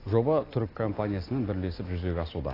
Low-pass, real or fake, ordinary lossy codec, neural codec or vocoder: 5.4 kHz; real; AAC, 24 kbps; none